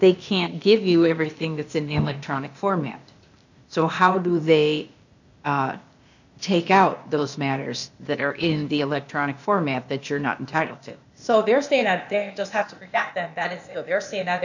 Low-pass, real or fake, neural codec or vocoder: 7.2 kHz; fake; codec, 16 kHz, 0.8 kbps, ZipCodec